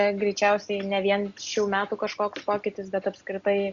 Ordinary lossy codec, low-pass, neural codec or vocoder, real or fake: Opus, 64 kbps; 7.2 kHz; none; real